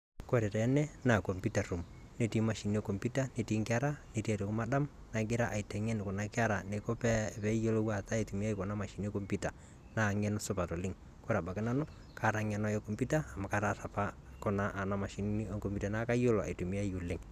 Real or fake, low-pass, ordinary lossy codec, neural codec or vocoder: real; 14.4 kHz; none; none